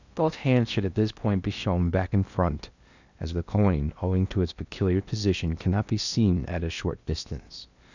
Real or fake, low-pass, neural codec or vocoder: fake; 7.2 kHz; codec, 16 kHz in and 24 kHz out, 0.8 kbps, FocalCodec, streaming, 65536 codes